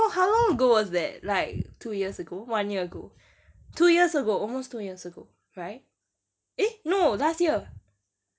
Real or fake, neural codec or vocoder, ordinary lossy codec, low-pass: real; none; none; none